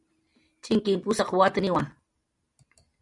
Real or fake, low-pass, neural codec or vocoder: real; 10.8 kHz; none